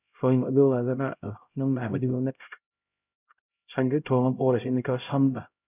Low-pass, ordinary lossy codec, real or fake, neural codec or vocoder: 3.6 kHz; none; fake; codec, 16 kHz, 0.5 kbps, X-Codec, HuBERT features, trained on LibriSpeech